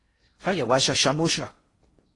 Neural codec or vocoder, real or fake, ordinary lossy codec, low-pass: codec, 16 kHz in and 24 kHz out, 0.6 kbps, FocalCodec, streaming, 4096 codes; fake; AAC, 32 kbps; 10.8 kHz